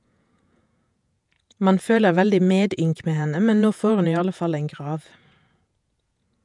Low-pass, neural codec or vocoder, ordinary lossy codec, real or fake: 10.8 kHz; vocoder, 48 kHz, 128 mel bands, Vocos; MP3, 96 kbps; fake